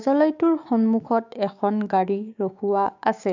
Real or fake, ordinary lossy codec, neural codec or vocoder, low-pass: fake; AAC, 48 kbps; codec, 16 kHz, 6 kbps, DAC; 7.2 kHz